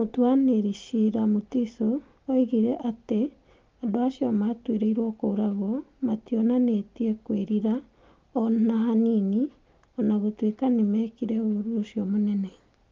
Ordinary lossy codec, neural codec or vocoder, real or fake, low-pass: Opus, 32 kbps; none; real; 7.2 kHz